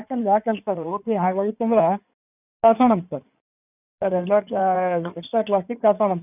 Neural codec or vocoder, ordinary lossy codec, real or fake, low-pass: codec, 16 kHz in and 24 kHz out, 2.2 kbps, FireRedTTS-2 codec; none; fake; 3.6 kHz